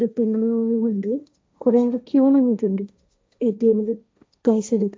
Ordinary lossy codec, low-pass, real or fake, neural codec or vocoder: none; none; fake; codec, 16 kHz, 1.1 kbps, Voila-Tokenizer